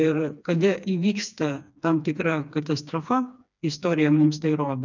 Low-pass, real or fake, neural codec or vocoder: 7.2 kHz; fake; codec, 16 kHz, 2 kbps, FreqCodec, smaller model